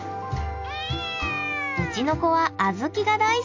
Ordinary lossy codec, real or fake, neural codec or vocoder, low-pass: AAC, 48 kbps; real; none; 7.2 kHz